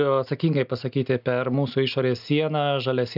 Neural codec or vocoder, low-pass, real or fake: none; 5.4 kHz; real